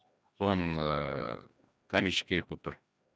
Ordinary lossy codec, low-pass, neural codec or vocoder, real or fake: none; none; codec, 16 kHz, 1 kbps, FreqCodec, larger model; fake